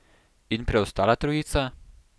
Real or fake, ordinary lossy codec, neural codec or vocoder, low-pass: real; none; none; none